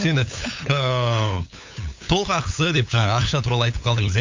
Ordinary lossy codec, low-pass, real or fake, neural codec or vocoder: MP3, 64 kbps; 7.2 kHz; fake; codec, 16 kHz, 8 kbps, FunCodec, trained on LibriTTS, 25 frames a second